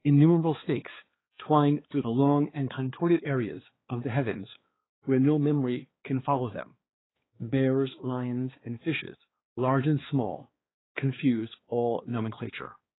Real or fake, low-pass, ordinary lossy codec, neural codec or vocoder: fake; 7.2 kHz; AAC, 16 kbps; codec, 16 kHz, 4 kbps, X-Codec, HuBERT features, trained on balanced general audio